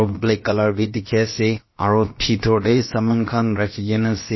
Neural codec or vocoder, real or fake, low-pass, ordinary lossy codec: codec, 16 kHz, about 1 kbps, DyCAST, with the encoder's durations; fake; 7.2 kHz; MP3, 24 kbps